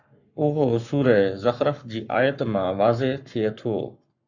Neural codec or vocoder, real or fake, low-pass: codec, 44.1 kHz, 7.8 kbps, Pupu-Codec; fake; 7.2 kHz